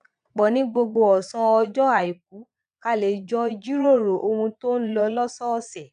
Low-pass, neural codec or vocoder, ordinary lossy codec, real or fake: 9.9 kHz; vocoder, 22.05 kHz, 80 mel bands, Vocos; AAC, 96 kbps; fake